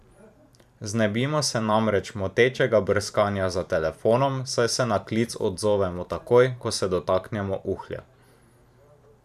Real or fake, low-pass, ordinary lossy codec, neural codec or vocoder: fake; 14.4 kHz; none; vocoder, 44.1 kHz, 128 mel bands every 512 samples, BigVGAN v2